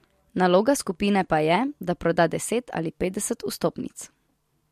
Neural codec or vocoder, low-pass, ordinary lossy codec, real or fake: none; 14.4 kHz; MP3, 64 kbps; real